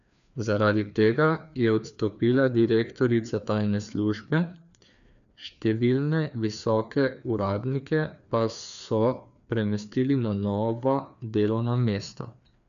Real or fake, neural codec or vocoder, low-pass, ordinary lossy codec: fake; codec, 16 kHz, 2 kbps, FreqCodec, larger model; 7.2 kHz; none